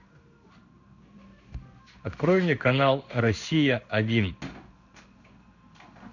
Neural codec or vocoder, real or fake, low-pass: codec, 16 kHz in and 24 kHz out, 1 kbps, XY-Tokenizer; fake; 7.2 kHz